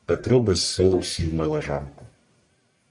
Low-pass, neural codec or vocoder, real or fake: 10.8 kHz; codec, 44.1 kHz, 1.7 kbps, Pupu-Codec; fake